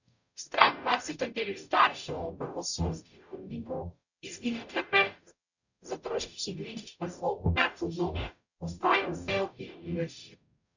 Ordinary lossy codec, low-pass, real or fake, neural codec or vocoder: none; 7.2 kHz; fake; codec, 44.1 kHz, 0.9 kbps, DAC